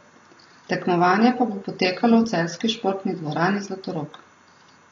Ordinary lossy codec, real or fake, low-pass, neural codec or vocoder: AAC, 32 kbps; real; 7.2 kHz; none